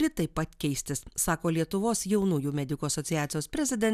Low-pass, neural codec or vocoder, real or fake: 14.4 kHz; none; real